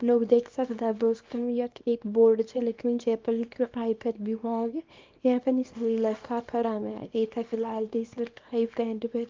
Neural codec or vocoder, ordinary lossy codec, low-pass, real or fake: codec, 24 kHz, 0.9 kbps, WavTokenizer, small release; Opus, 24 kbps; 7.2 kHz; fake